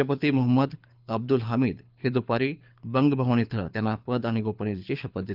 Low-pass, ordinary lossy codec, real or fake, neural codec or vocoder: 5.4 kHz; Opus, 24 kbps; fake; codec, 24 kHz, 6 kbps, HILCodec